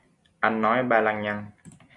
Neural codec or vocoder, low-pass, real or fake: none; 10.8 kHz; real